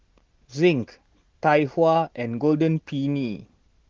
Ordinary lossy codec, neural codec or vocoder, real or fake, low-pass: Opus, 16 kbps; none; real; 7.2 kHz